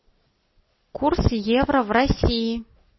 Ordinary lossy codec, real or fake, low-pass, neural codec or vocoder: MP3, 24 kbps; real; 7.2 kHz; none